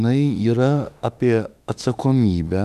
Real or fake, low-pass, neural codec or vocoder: fake; 14.4 kHz; autoencoder, 48 kHz, 32 numbers a frame, DAC-VAE, trained on Japanese speech